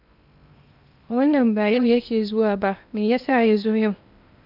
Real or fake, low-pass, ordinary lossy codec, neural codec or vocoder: fake; 5.4 kHz; none; codec, 16 kHz in and 24 kHz out, 0.8 kbps, FocalCodec, streaming, 65536 codes